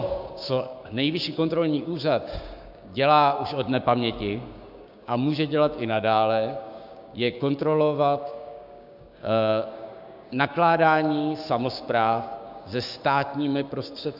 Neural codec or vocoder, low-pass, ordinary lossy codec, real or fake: codec, 16 kHz, 6 kbps, DAC; 5.4 kHz; AAC, 48 kbps; fake